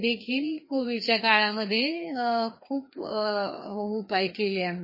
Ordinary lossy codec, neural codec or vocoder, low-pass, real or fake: MP3, 24 kbps; codec, 16 kHz, 2 kbps, FreqCodec, larger model; 5.4 kHz; fake